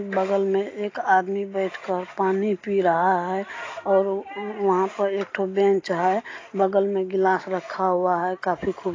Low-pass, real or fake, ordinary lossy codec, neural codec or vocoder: 7.2 kHz; real; AAC, 32 kbps; none